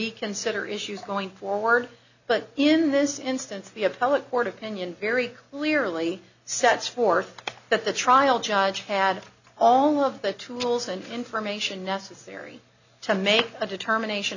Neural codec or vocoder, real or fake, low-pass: none; real; 7.2 kHz